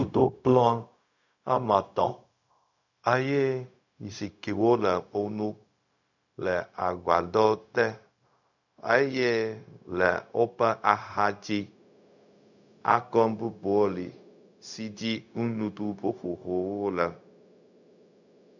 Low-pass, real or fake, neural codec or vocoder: 7.2 kHz; fake; codec, 16 kHz, 0.4 kbps, LongCat-Audio-Codec